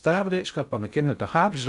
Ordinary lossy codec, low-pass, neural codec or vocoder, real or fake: AAC, 96 kbps; 10.8 kHz; codec, 16 kHz in and 24 kHz out, 0.6 kbps, FocalCodec, streaming, 2048 codes; fake